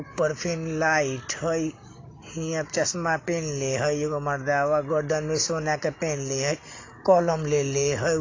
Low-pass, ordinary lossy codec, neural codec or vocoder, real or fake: 7.2 kHz; AAC, 32 kbps; none; real